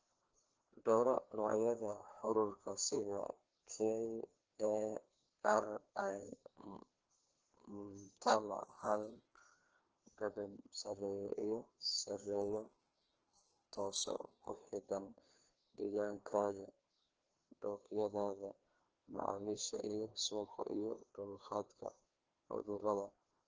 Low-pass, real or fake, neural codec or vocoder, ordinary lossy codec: 7.2 kHz; fake; codec, 16 kHz, 2 kbps, FreqCodec, larger model; Opus, 16 kbps